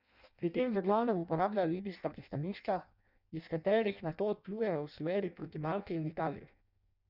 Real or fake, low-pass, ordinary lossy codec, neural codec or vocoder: fake; 5.4 kHz; none; codec, 16 kHz in and 24 kHz out, 0.6 kbps, FireRedTTS-2 codec